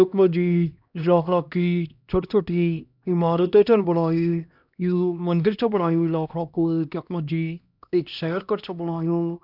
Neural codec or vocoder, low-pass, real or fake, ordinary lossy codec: codec, 16 kHz, 1 kbps, X-Codec, HuBERT features, trained on LibriSpeech; 5.4 kHz; fake; none